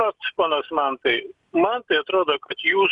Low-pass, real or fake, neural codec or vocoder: 10.8 kHz; real; none